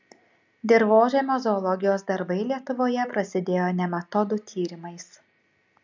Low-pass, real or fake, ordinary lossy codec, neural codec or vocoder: 7.2 kHz; real; MP3, 64 kbps; none